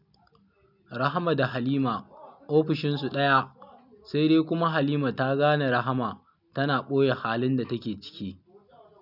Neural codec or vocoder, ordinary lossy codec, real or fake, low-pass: none; none; real; 5.4 kHz